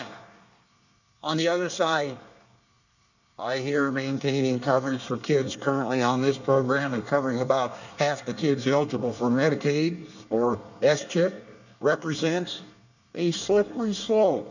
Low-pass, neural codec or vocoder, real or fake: 7.2 kHz; codec, 24 kHz, 1 kbps, SNAC; fake